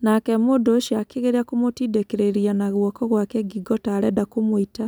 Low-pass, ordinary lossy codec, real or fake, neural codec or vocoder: none; none; real; none